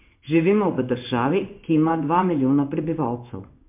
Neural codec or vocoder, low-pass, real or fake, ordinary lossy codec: codec, 16 kHz in and 24 kHz out, 1 kbps, XY-Tokenizer; 3.6 kHz; fake; MP3, 32 kbps